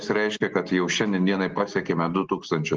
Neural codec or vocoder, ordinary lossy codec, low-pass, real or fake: none; Opus, 16 kbps; 7.2 kHz; real